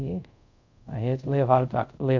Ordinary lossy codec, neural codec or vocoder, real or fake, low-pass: none; codec, 24 kHz, 0.5 kbps, DualCodec; fake; 7.2 kHz